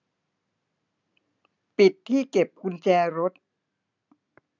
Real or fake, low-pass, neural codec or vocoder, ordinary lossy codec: real; 7.2 kHz; none; none